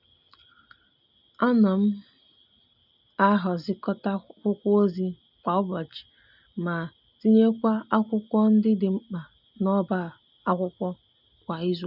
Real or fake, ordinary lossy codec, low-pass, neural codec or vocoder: real; none; 5.4 kHz; none